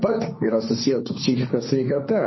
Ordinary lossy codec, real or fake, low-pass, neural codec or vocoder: MP3, 24 kbps; fake; 7.2 kHz; codec, 16 kHz, 1.1 kbps, Voila-Tokenizer